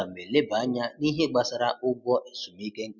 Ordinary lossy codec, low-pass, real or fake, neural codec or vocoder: none; 7.2 kHz; real; none